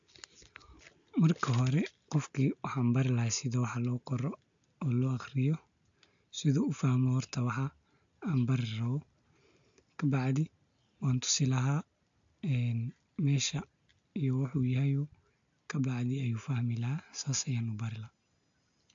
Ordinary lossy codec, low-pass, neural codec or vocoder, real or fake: AAC, 64 kbps; 7.2 kHz; none; real